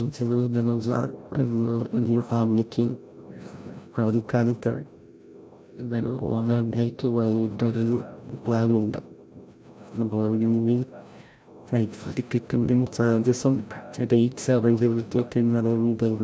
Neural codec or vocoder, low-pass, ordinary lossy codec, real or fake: codec, 16 kHz, 0.5 kbps, FreqCodec, larger model; none; none; fake